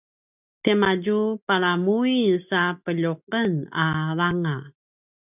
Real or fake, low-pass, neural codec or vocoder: real; 3.6 kHz; none